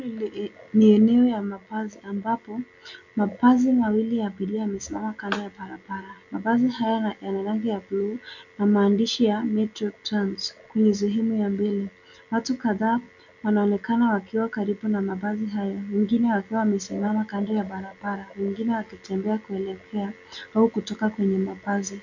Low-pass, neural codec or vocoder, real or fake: 7.2 kHz; none; real